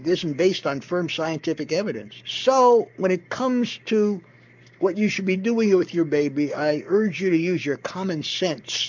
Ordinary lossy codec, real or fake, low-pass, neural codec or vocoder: MP3, 64 kbps; fake; 7.2 kHz; codec, 44.1 kHz, 7.8 kbps, Pupu-Codec